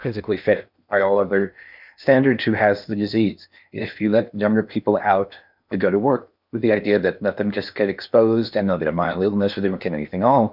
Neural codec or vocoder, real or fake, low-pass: codec, 16 kHz in and 24 kHz out, 0.8 kbps, FocalCodec, streaming, 65536 codes; fake; 5.4 kHz